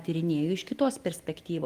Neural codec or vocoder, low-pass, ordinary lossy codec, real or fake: none; 14.4 kHz; Opus, 24 kbps; real